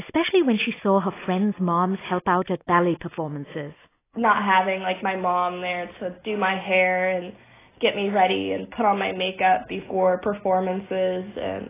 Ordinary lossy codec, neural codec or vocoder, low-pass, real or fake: AAC, 16 kbps; none; 3.6 kHz; real